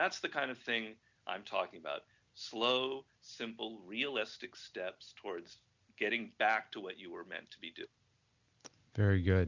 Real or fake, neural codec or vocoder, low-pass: real; none; 7.2 kHz